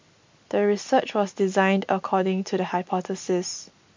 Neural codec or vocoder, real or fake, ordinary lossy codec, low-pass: none; real; MP3, 48 kbps; 7.2 kHz